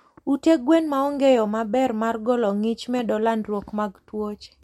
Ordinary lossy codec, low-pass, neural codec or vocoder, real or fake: MP3, 64 kbps; 19.8 kHz; none; real